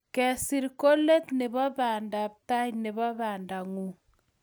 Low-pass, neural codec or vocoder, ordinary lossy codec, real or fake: none; none; none; real